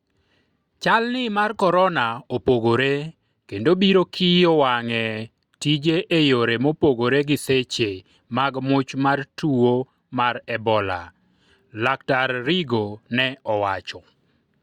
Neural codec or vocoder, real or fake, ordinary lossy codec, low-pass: none; real; Opus, 64 kbps; 19.8 kHz